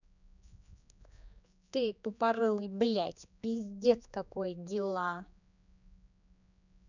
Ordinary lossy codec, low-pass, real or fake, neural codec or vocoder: none; 7.2 kHz; fake; codec, 16 kHz, 2 kbps, X-Codec, HuBERT features, trained on general audio